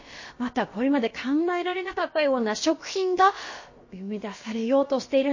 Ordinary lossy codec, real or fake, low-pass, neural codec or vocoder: MP3, 32 kbps; fake; 7.2 kHz; codec, 16 kHz, about 1 kbps, DyCAST, with the encoder's durations